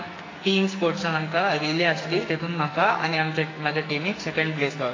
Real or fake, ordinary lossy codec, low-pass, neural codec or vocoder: fake; AAC, 32 kbps; 7.2 kHz; codec, 44.1 kHz, 2.6 kbps, SNAC